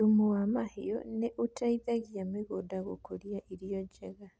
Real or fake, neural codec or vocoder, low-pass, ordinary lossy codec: real; none; none; none